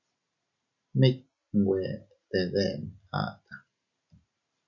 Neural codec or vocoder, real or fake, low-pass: none; real; 7.2 kHz